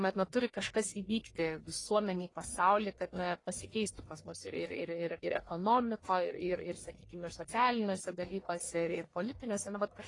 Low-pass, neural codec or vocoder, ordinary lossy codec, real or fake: 10.8 kHz; codec, 44.1 kHz, 1.7 kbps, Pupu-Codec; AAC, 32 kbps; fake